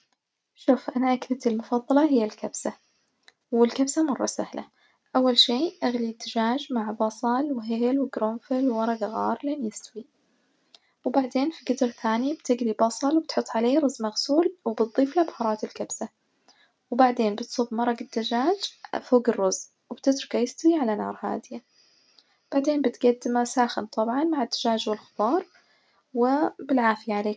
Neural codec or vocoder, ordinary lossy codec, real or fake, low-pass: none; none; real; none